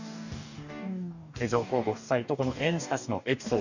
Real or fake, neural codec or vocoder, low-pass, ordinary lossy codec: fake; codec, 44.1 kHz, 2.6 kbps, DAC; 7.2 kHz; none